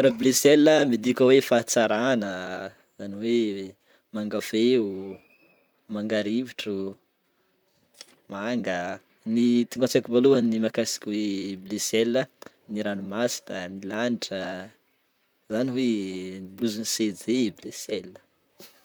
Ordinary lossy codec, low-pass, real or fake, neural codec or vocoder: none; none; fake; vocoder, 44.1 kHz, 128 mel bands, Pupu-Vocoder